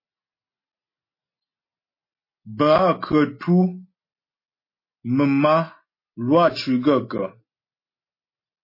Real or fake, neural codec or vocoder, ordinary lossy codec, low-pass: real; none; MP3, 24 kbps; 5.4 kHz